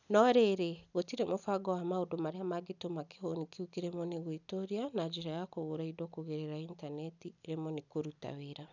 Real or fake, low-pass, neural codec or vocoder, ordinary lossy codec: real; 7.2 kHz; none; none